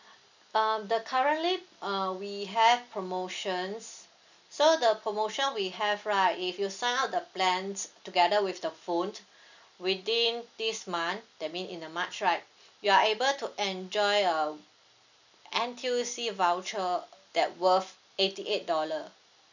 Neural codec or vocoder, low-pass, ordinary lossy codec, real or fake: none; 7.2 kHz; none; real